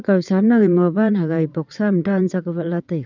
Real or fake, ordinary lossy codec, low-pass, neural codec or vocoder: fake; none; 7.2 kHz; vocoder, 22.05 kHz, 80 mel bands, WaveNeXt